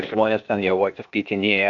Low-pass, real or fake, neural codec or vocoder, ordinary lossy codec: 7.2 kHz; fake; codec, 16 kHz, 0.8 kbps, ZipCodec; AAC, 64 kbps